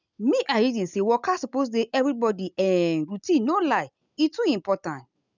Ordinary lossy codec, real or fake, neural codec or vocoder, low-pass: none; real; none; 7.2 kHz